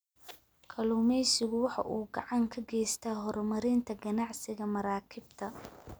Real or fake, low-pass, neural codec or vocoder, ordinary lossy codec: real; none; none; none